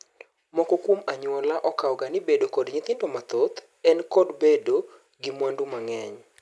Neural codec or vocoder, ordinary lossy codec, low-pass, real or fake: none; none; none; real